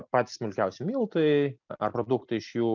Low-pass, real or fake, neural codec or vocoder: 7.2 kHz; real; none